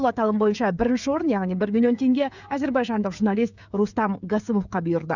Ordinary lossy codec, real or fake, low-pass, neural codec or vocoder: none; fake; 7.2 kHz; codec, 16 kHz, 16 kbps, FreqCodec, smaller model